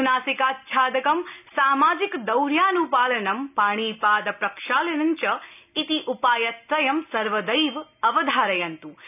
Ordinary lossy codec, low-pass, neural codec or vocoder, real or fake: none; 3.6 kHz; none; real